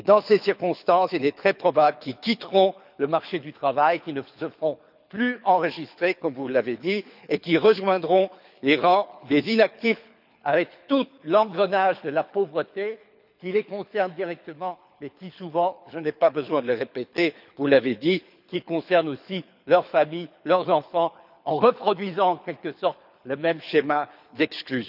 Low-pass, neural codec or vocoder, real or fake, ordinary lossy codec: 5.4 kHz; codec, 24 kHz, 6 kbps, HILCodec; fake; none